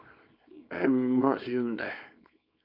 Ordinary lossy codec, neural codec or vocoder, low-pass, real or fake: AAC, 48 kbps; codec, 24 kHz, 0.9 kbps, WavTokenizer, small release; 5.4 kHz; fake